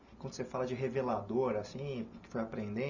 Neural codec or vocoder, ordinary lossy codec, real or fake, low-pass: none; none; real; 7.2 kHz